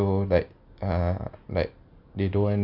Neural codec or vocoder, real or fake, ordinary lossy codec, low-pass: none; real; none; 5.4 kHz